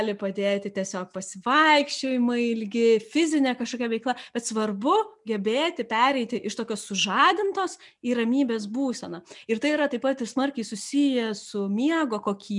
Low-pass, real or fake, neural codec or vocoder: 10.8 kHz; real; none